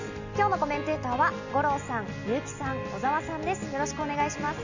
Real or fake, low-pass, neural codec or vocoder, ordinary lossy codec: real; 7.2 kHz; none; none